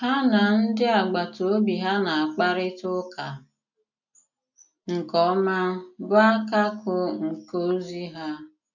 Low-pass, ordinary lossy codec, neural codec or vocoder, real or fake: 7.2 kHz; none; none; real